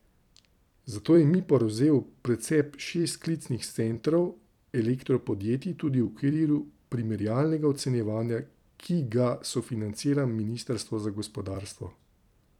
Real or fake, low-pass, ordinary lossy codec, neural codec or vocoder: fake; 19.8 kHz; none; vocoder, 44.1 kHz, 128 mel bands every 256 samples, BigVGAN v2